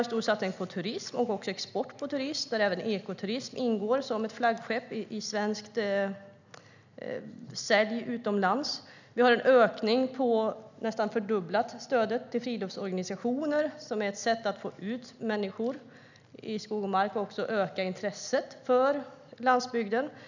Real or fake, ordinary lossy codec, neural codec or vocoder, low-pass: real; none; none; 7.2 kHz